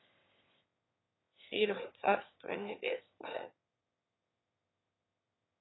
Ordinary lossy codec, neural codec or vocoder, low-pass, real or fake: AAC, 16 kbps; autoencoder, 22.05 kHz, a latent of 192 numbers a frame, VITS, trained on one speaker; 7.2 kHz; fake